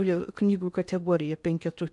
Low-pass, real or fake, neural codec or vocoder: 10.8 kHz; fake; codec, 16 kHz in and 24 kHz out, 0.8 kbps, FocalCodec, streaming, 65536 codes